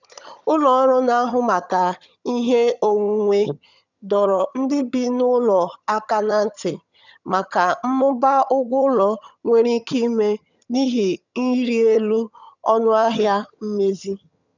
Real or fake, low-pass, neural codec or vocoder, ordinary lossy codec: fake; 7.2 kHz; vocoder, 22.05 kHz, 80 mel bands, HiFi-GAN; none